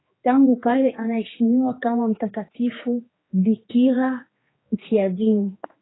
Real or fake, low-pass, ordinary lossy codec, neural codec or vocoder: fake; 7.2 kHz; AAC, 16 kbps; codec, 16 kHz, 2 kbps, X-Codec, HuBERT features, trained on general audio